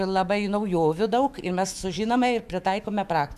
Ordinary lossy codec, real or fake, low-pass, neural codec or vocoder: AAC, 96 kbps; fake; 14.4 kHz; autoencoder, 48 kHz, 128 numbers a frame, DAC-VAE, trained on Japanese speech